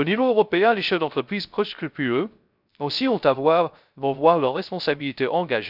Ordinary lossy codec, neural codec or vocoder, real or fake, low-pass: none; codec, 16 kHz, 0.3 kbps, FocalCodec; fake; 5.4 kHz